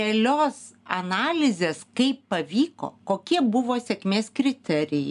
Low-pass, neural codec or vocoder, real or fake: 10.8 kHz; none; real